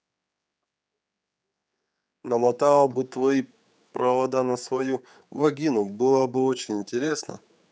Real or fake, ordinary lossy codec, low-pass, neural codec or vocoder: fake; none; none; codec, 16 kHz, 4 kbps, X-Codec, HuBERT features, trained on general audio